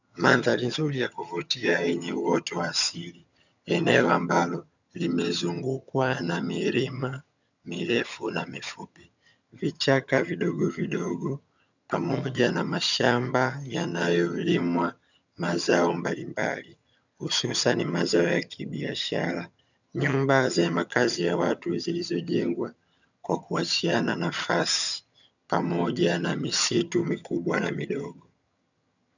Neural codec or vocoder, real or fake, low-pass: vocoder, 22.05 kHz, 80 mel bands, HiFi-GAN; fake; 7.2 kHz